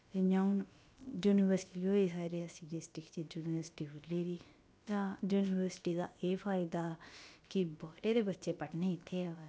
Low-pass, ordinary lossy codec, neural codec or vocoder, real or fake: none; none; codec, 16 kHz, about 1 kbps, DyCAST, with the encoder's durations; fake